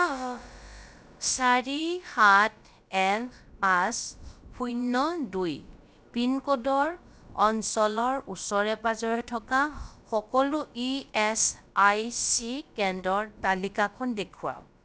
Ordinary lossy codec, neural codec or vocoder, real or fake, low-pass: none; codec, 16 kHz, about 1 kbps, DyCAST, with the encoder's durations; fake; none